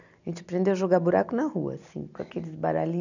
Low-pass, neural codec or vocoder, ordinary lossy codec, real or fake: 7.2 kHz; none; none; real